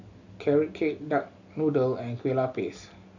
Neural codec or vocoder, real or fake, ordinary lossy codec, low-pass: none; real; none; 7.2 kHz